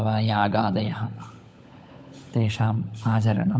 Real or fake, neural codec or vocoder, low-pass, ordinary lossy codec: fake; codec, 16 kHz, 16 kbps, FunCodec, trained on LibriTTS, 50 frames a second; none; none